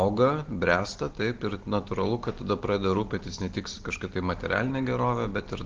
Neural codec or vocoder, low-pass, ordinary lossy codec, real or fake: none; 7.2 kHz; Opus, 16 kbps; real